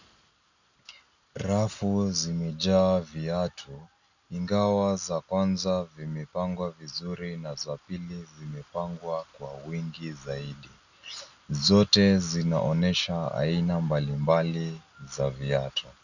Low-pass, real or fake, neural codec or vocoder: 7.2 kHz; real; none